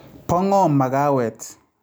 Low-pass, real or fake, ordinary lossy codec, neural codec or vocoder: none; real; none; none